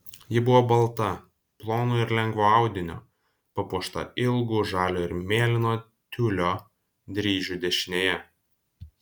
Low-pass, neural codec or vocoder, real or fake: 19.8 kHz; none; real